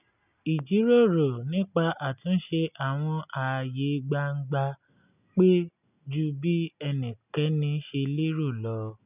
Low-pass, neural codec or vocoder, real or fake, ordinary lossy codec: 3.6 kHz; none; real; none